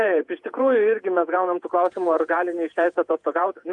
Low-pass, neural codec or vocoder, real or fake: 10.8 kHz; vocoder, 48 kHz, 128 mel bands, Vocos; fake